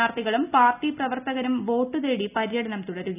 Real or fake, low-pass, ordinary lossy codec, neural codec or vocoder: real; 3.6 kHz; none; none